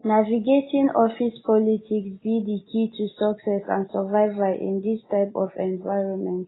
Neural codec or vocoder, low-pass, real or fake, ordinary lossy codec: none; 7.2 kHz; real; AAC, 16 kbps